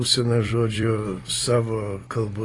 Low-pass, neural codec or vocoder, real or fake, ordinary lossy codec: 10.8 kHz; vocoder, 44.1 kHz, 128 mel bands every 256 samples, BigVGAN v2; fake; AAC, 32 kbps